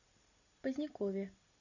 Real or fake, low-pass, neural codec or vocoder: real; 7.2 kHz; none